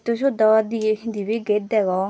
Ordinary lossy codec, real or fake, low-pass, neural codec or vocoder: none; real; none; none